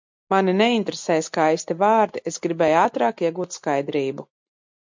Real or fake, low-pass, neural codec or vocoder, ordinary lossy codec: real; 7.2 kHz; none; MP3, 64 kbps